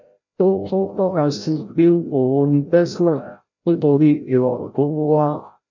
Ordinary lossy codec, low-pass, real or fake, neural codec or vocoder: none; 7.2 kHz; fake; codec, 16 kHz, 0.5 kbps, FreqCodec, larger model